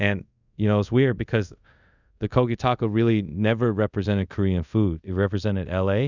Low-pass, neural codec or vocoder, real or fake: 7.2 kHz; codec, 24 kHz, 0.5 kbps, DualCodec; fake